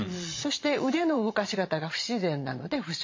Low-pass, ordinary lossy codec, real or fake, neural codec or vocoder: 7.2 kHz; MP3, 48 kbps; fake; vocoder, 22.05 kHz, 80 mel bands, Vocos